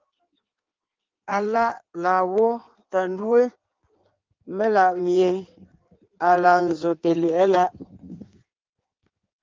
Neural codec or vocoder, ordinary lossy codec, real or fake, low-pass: codec, 16 kHz in and 24 kHz out, 1.1 kbps, FireRedTTS-2 codec; Opus, 32 kbps; fake; 7.2 kHz